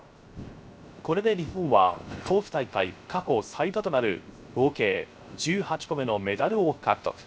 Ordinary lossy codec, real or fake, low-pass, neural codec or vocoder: none; fake; none; codec, 16 kHz, 0.3 kbps, FocalCodec